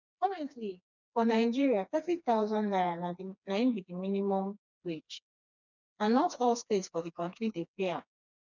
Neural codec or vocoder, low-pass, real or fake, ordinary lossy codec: codec, 16 kHz, 2 kbps, FreqCodec, smaller model; 7.2 kHz; fake; none